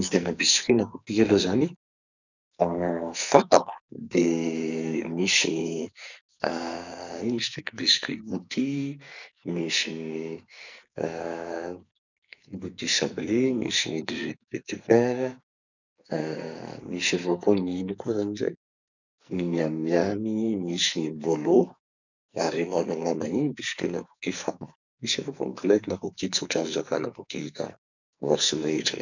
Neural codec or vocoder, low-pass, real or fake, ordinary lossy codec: codec, 44.1 kHz, 2.6 kbps, SNAC; 7.2 kHz; fake; none